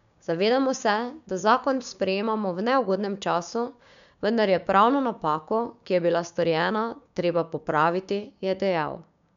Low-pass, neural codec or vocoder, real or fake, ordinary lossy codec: 7.2 kHz; codec, 16 kHz, 6 kbps, DAC; fake; none